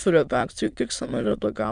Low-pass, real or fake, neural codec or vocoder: 9.9 kHz; fake; autoencoder, 22.05 kHz, a latent of 192 numbers a frame, VITS, trained on many speakers